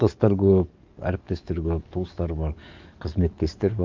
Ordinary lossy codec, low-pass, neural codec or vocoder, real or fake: Opus, 32 kbps; 7.2 kHz; codec, 44.1 kHz, 7.8 kbps, DAC; fake